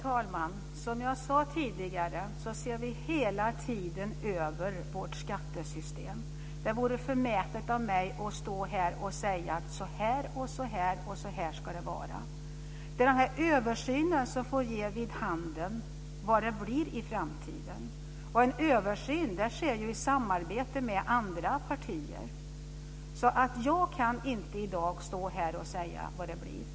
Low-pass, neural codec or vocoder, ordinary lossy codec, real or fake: none; none; none; real